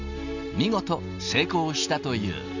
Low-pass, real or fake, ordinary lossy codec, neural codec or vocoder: 7.2 kHz; real; none; none